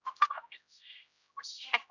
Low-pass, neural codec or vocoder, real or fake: 7.2 kHz; codec, 16 kHz, 0.5 kbps, X-Codec, HuBERT features, trained on general audio; fake